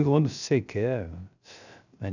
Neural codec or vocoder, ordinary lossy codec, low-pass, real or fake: codec, 16 kHz, 0.3 kbps, FocalCodec; none; 7.2 kHz; fake